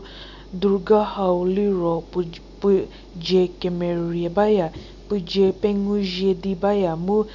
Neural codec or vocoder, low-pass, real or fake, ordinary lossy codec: none; 7.2 kHz; real; none